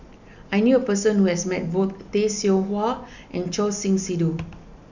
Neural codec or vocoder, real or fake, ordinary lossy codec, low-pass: none; real; none; 7.2 kHz